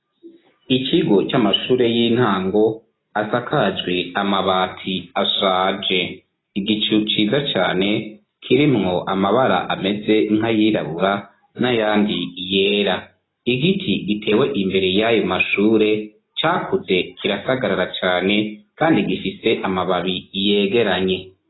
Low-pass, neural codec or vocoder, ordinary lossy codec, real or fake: 7.2 kHz; none; AAC, 16 kbps; real